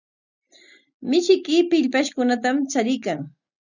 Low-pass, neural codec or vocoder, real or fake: 7.2 kHz; none; real